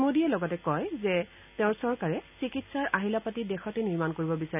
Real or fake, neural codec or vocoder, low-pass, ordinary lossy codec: real; none; 3.6 kHz; none